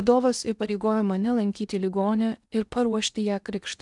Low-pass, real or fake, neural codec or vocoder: 10.8 kHz; fake; codec, 16 kHz in and 24 kHz out, 0.8 kbps, FocalCodec, streaming, 65536 codes